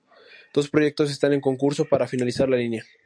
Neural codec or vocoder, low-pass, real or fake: none; 9.9 kHz; real